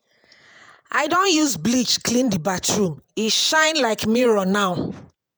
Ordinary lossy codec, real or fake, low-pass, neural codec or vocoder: none; fake; none; vocoder, 48 kHz, 128 mel bands, Vocos